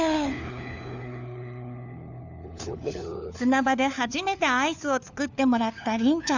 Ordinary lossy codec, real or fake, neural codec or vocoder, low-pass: none; fake; codec, 16 kHz, 4 kbps, FunCodec, trained on LibriTTS, 50 frames a second; 7.2 kHz